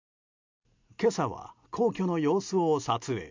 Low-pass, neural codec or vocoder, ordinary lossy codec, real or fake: 7.2 kHz; none; MP3, 64 kbps; real